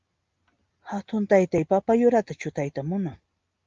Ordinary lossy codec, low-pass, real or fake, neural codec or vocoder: Opus, 32 kbps; 7.2 kHz; real; none